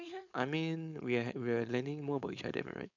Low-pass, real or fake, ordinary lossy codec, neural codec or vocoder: 7.2 kHz; fake; none; codec, 16 kHz, 4.8 kbps, FACodec